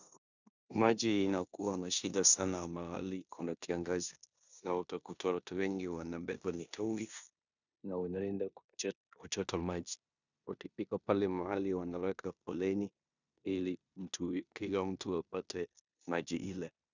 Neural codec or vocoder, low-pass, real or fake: codec, 16 kHz in and 24 kHz out, 0.9 kbps, LongCat-Audio-Codec, four codebook decoder; 7.2 kHz; fake